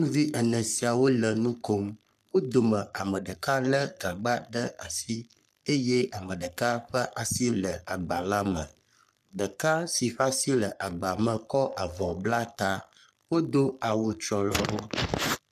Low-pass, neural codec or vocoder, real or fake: 14.4 kHz; codec, 44.1 kHz, 3.4 kbps, Pupu-Codec; fake